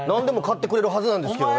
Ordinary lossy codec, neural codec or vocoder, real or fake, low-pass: none; none; real; none